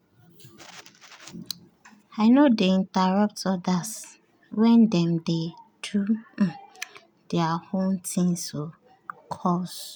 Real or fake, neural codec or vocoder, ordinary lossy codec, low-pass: real; none; none; none